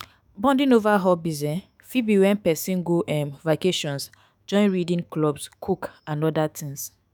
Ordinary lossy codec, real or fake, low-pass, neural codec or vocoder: none; fake; none; autoencoder, 48 kHz, 128 numbers a frame, DAC-VAE, trained on Japanese speech